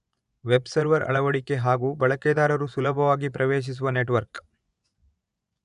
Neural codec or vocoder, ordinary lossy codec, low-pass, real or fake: vocoder, 24 kHz, 100 mel bands, Vocos; none; 10.8 kHz; fake